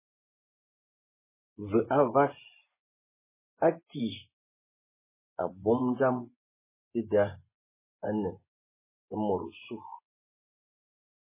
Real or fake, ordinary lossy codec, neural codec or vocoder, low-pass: fake; MP3, 16 kbps; vocoder, 24 kHz, 100 mel bands, Vocos; 3.6 kHz